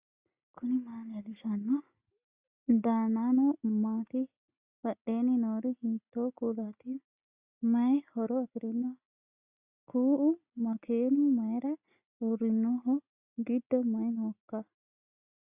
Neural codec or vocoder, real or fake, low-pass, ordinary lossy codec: none; real; 3.6 kHz; Opus, 64 kbps